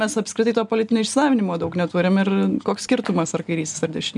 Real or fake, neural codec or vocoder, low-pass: real; none; 10.8 kHz